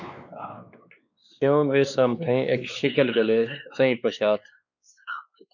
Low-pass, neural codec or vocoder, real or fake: 7.2 kHz; codec, 16 kHz, 2 kbps, X-Codec, WavLM features, trained on Multilingual LibriSpeech; fake